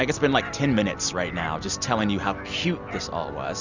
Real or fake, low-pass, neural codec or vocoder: real; 7.2 kHz; none